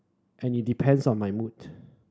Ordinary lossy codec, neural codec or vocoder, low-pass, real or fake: none; none; none; real